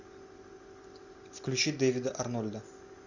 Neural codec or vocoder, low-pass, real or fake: none; 7.2 kHz; real